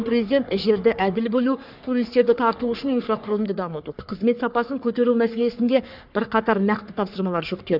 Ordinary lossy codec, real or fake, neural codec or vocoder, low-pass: none; fake; codec, 44.1 kHz, 3.4 kbps, Pupu-Codec; 5.4 kHz